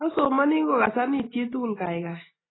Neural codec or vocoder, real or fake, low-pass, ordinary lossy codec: none; real; 7.2 kHz; AAC, 16 kbps